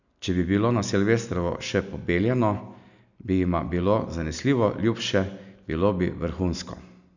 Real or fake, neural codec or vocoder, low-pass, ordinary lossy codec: real; none; 7.2 kHz; none